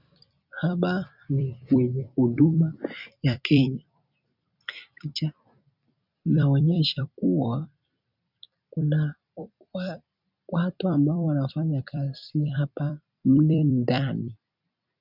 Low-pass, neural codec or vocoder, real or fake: 5.4 kHz; vocoder, 44.1 kHz, 128 mel bands every 256 samples, BigVGAN v2; fake